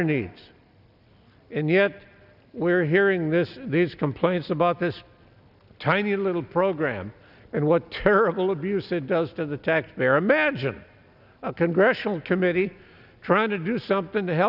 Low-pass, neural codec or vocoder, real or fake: 5.4 kHz; none; real